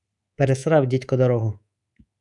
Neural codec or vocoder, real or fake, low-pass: codec, 24 kHz, 3.1 kbps, DualCodec; fake; 10.8 kHz